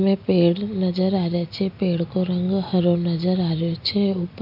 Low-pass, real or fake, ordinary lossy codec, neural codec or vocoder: 5.4 kHz; real; AAC, 48 kbps; none